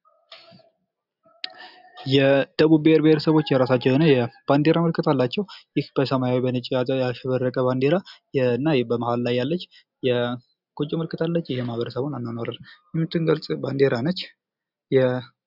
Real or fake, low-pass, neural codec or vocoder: real; 5.4 kHz; none